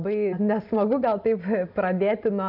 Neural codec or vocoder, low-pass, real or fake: none; 5.4 kHz; real